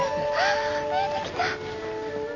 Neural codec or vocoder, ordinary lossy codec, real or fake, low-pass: none; none; real; 7.2 kHz